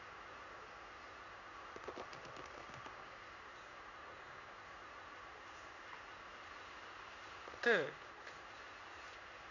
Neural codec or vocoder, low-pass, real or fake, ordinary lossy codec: codec, 16 kHz in and 24 kHz out, 1 kbps, XY-Tokenizer; 7.2 kHz; fake; none